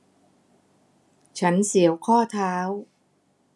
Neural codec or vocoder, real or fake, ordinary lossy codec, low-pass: none; real; none; none